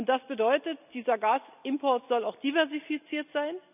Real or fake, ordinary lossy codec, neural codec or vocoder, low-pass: real; none; none; 3.6 kHz